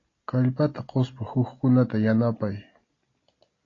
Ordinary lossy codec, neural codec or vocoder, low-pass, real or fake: AAC, 32 kbps; none; 7.2 kHz; real